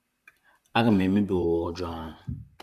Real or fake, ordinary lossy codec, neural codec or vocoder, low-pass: fake; none; vocoder, 44.1 kHz, 128 mel bands, Pupu-Vocoder; 14.4 kHz